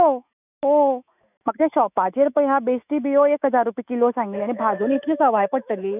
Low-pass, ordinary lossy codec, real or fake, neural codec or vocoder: 3.6 kHz; none; fake; autoencoder, 48 kHz, 128 numbers a frame, DAC-VAE, trained on Japanese speech